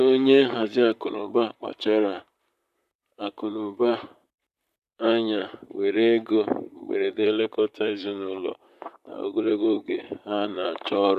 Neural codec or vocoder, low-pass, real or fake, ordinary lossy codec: vocoder, 44.1 kHz, 128 mel bands, Pupu-Vocoder; 14.4 kHz; fake; none